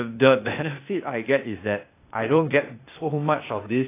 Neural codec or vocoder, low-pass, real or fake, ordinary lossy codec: codec, 16 kHz, 0.8 kbps, ZipCodec; 3.6 kHz; fake; AAC, 24 kbps